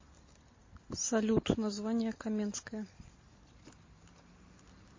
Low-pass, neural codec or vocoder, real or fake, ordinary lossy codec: 7.2 kHz; none; real; MP3, 32 kbps